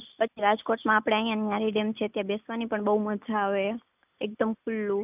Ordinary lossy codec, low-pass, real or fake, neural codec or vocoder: none; 3.6 kHz; real; none